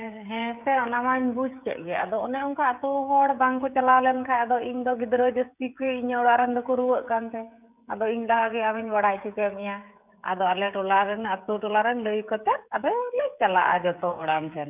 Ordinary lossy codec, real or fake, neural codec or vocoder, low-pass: none; fake; codec, 16 kHz, 16 kbps, FreqCodec, smaller model; 3.6 kHz